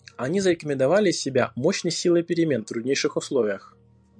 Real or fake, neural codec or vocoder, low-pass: real; none; 9.9 kHz